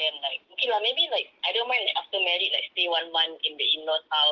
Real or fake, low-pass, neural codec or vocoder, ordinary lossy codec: real; 7.2 kHz; none; Opus, 16 kbps